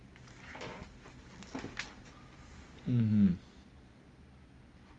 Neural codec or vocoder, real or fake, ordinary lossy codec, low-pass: none; real; Opus, 32 kbps; 9.9 kHz